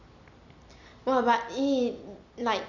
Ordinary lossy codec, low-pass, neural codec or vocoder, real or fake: none; 7.2 kHz; none; real